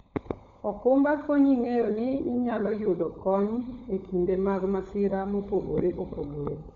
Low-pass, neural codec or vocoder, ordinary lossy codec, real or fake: 7.2 kHz; codec, 16 kHz, 16 kbps, FunCodec, trained on LibriTTS, 50 frames a second; MP3, 96 kbps; fake